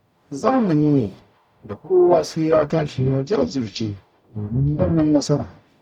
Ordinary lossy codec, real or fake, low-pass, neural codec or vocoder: none; fake; 19.8 kHz; codec, 44.1 kHz, 0.9 kbps, DAC